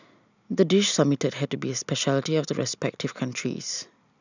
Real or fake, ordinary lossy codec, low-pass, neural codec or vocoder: real; none; 7.2 kHz; none